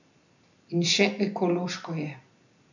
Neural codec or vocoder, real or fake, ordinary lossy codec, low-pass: none; real; none; 7.2 kHz